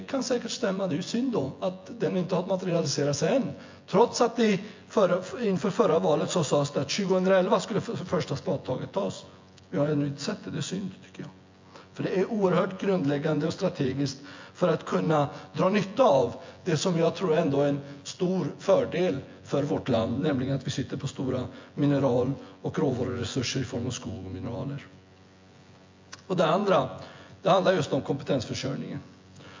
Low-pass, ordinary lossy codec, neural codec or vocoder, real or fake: 7.2 kHz; MP3, 48 kbps; vocoder, 24 kHz, 100 mel bands, Vocos; fake